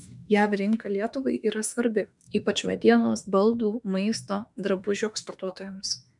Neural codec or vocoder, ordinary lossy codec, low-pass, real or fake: autoencoder, 48 kHz, 32 numbers a frame, DAC-VAE, trained on Japanese speech; MP3, 96 kbps; 10.8 kHz; fake